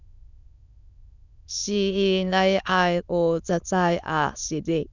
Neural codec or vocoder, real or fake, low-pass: autoencoder, 22.05 kHz, a latent of 192 numbers a frame, VITS, trained on many speakers; fake; 7.2 kHz